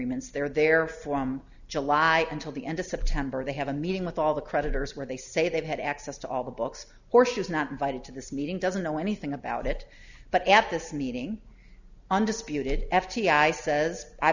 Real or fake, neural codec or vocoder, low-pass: real; none; 7.2 kHz